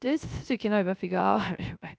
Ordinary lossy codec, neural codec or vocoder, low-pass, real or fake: none; codec, 16 kHz, 0.3 kbps, FocalCodec; none; fake